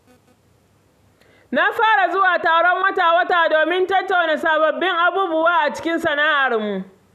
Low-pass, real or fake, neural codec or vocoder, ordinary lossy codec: 14.4 kHz; real; none; none